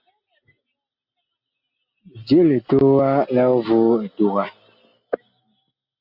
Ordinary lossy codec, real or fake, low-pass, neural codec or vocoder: AAC, 48 kbps; real; 5.4 kHz; none